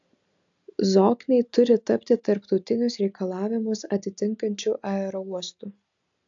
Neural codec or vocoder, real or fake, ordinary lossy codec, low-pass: none; real; AAC, 64 kbps; 7.2 kHz